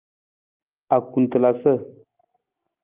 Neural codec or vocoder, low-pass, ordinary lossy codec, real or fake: none; 3.6 kHz; Opus, 32 kbps; real